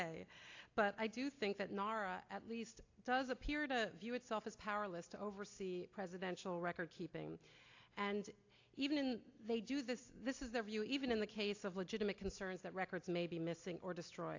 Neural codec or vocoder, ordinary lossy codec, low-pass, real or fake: none; AAC, 48 kbps; 7.2 kHz; real